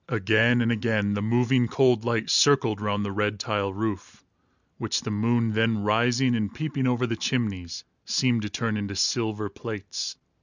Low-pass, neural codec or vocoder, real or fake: 7.2 kHz; none; real